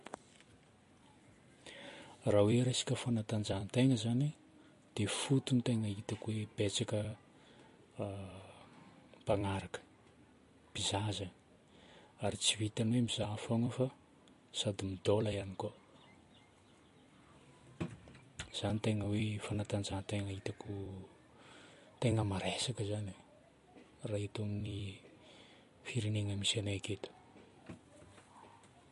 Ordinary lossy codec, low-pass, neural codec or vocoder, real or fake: MP3, 48 kbps; 14.4 kHz; vocoder, 44.1 kHz, 128 mel bands every 256 samples, BigVGAN v2; fake